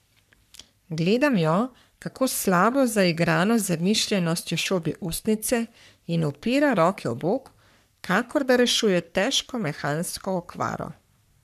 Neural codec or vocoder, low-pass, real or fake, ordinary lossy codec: codec, 44.1 kHz, 3.4 kbps, Pupu-Codec; 14.4 kHz; fake; none